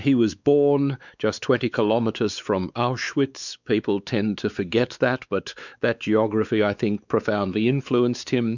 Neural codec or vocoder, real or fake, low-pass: codec, 16 kHz, 4 kbps, X-Codec, WavLM features, trained on Multilingual LibriSpeech; fake; 7.2 kHz